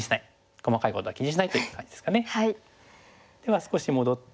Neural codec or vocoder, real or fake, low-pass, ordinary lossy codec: none; real; none; none